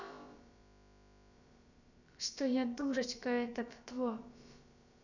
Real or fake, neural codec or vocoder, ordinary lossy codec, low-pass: fake; codec, 16 kHz, about 1 kbps, DyCAST, with the encoder's durations; none; 7.2 kHz